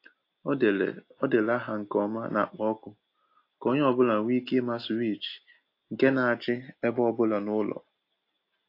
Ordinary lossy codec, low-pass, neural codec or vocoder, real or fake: AAC, 32 kbps; 5.4 kHz; none; real